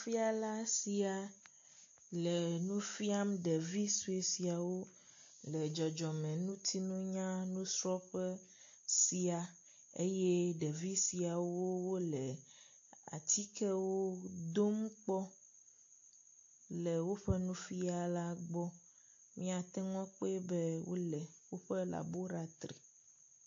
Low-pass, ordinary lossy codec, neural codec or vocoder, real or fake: 7.2 kHz; AAC, 48 kbps; none; real